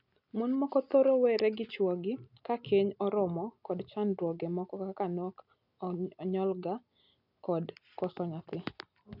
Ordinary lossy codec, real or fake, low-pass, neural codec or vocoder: none; real; 5.4 kHz; none